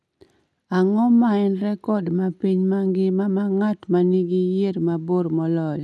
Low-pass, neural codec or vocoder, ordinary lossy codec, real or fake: none; none; none; real